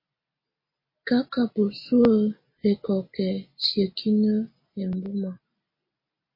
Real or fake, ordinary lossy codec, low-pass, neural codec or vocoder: real; MP3, 24 kbps; 5.4 kHz; none